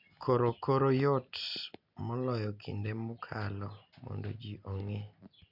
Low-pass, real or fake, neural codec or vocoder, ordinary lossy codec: 5.4 kHz; real; none; MP3, 48 kbps